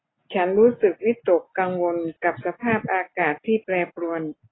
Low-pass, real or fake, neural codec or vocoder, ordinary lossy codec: 7.2 kHz; real; none; AAC, 16 kbps